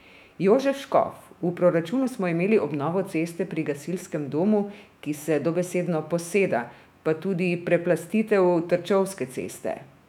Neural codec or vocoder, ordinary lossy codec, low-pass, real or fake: autoencoder, 48 kHz, 128 numbers a frame, DAC-VAE, trained on Japanese speech; none; 19.8 kHz; fake